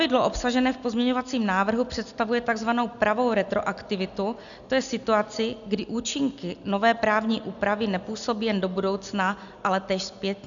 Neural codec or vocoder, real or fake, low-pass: none; real; 7.2 kHz